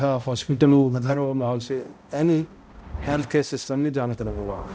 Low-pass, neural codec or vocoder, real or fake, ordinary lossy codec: none; codec, 16 kHz, 0.5 kbps, X-Codec, HuBERT features, trained on balanced general audio; fake; none